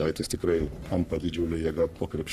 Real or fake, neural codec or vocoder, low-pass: fake; codec, 44.1 kHz, 3.4 kbps, Pupu-Codec; 14.4 kHz